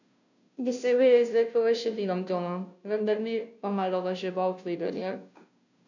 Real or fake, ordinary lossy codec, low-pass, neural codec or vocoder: fake; none; 7.2 kHz; codec, 16 kHz, 0.5 kbps, FunCodec, trained on Chinese and English, 25 frames a second